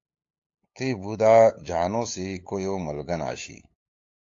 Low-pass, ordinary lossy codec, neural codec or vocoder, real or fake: 7.2 kHz; AAC, 48 kbps; codec, 16 kHz, 8 kbps, FunCodec, trained on LibriTTS, 25 frames a second; fake